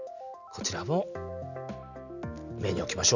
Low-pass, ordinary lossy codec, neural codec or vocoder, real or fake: 7.2 kHz; none; none; real